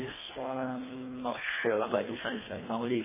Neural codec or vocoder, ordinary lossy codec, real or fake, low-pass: codec, 24 kHz, 1.5 kbps, HILCodec; MP3, 16 kbps; fake; 3.6 kHz